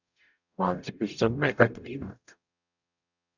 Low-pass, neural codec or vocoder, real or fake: 7.2 kHz; codec, 44.1 kHz, 0.9 kbps, DAC; fake